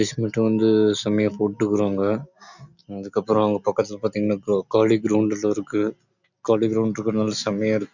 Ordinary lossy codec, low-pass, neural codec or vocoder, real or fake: none; 7.2 kHz; none; real